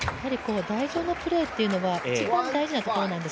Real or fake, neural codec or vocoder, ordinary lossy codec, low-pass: real; none; none; none